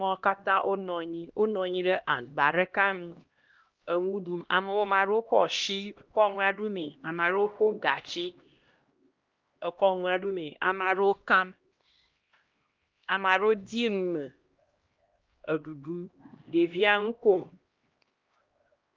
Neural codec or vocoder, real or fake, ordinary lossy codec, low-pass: codec, 16 kHz, 1 kbps, X-Codec, HuBERT features, trained on LibriSpeech; fake; Opus, 32 kbps; 7.2 kHz